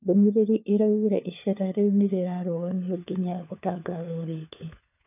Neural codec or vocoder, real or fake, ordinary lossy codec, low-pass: codec, 16 kHz, 4 kbps, FunCodec, trained on LibriTTS, 50 frames a second; fake; none; 3.6 kHz